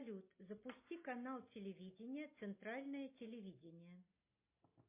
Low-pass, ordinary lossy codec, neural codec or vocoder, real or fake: 3.6 kHz; MP3, 24 kbps; none; real